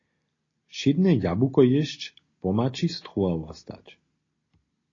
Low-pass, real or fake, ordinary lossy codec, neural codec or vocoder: 7.2 kHz; real; AAC, 32 kbps; none